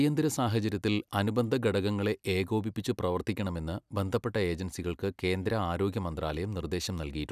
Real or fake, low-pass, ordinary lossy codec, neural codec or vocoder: real; 14.4 kHz; none; none